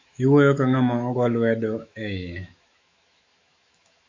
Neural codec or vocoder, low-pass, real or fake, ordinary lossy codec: autoencoder, 48 kHz, 128 numbers a frame, DAC-VAE, trained on Japanese speech; 7.2 kHz; fake; AAC, 48 kbps